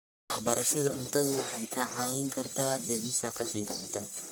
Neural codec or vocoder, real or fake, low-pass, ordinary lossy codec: codec, 44.1 kHz, 1.7 kbps, Pupu-Codec; fake; none; none